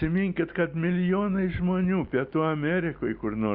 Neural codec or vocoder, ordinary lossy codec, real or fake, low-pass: none; Opus, 64 kbps; real; 5.4 kHz